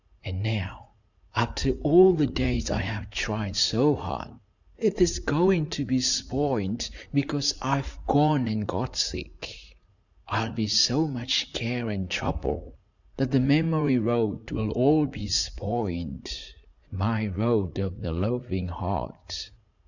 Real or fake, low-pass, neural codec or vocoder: fake; 7.2 kHz; vocoder, 44.1 kHz, 80 mel bands, Vocos